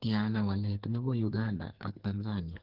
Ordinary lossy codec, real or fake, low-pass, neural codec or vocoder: Opus, 16 kbps; fake; 5.4 kHz; codec, 44.1 kHz, 2.6 kbps, SNAC